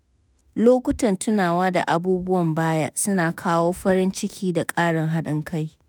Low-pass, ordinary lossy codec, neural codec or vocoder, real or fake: none; none; autoencoder, 48 kHz, 32 numbers a frame, DAC-VAE, trained on Japanese speech; fake